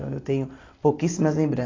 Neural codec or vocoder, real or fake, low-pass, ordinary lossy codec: none; real; 7.2 kHz; AAC, 32 kbps